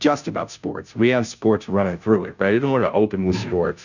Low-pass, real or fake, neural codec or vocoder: 7.2 kHz; fake; codec, 16 kHz, 0.5 kbps, FunCodec, trained on Chinese and English, 25 frames a second